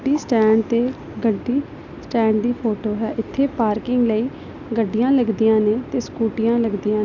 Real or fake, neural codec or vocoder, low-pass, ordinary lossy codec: real; none; 7.2 kHz; none